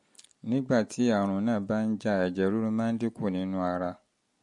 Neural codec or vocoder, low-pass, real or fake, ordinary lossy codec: none; 10.8 kHz; real; MP3, 48 kbps